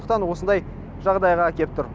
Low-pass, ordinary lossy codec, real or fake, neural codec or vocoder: none; none; real; none